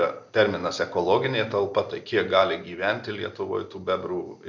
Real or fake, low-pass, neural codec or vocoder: real; 7.2 kHz; none